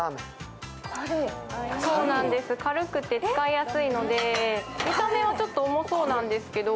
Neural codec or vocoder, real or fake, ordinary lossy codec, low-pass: none; real; none; none